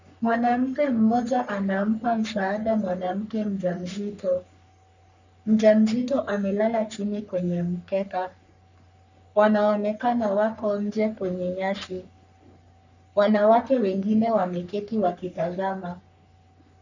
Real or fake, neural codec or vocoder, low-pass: fake; codec, 44.1 kHz, 3.4 kbps, Pupu-Codec; 7.2 kHz